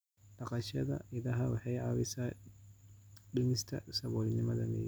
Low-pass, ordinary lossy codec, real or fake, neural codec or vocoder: none; none; real; none